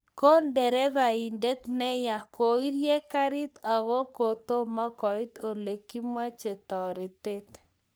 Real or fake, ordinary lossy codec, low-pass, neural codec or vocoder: fake; none; none; codec, 44.1 kHz, 3.4 kbps, Pupu-Codec